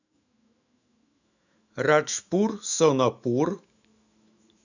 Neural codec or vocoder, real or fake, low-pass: autoencoder, 48 kHz, 128 numbers a frame, DAC-VAE, trained on Japanese speech; fake; 7.2 kHz